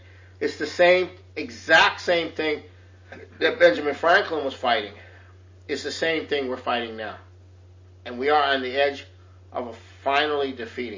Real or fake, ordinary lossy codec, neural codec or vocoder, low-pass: real; MP3, 32 kbps; none; 7.2 kHz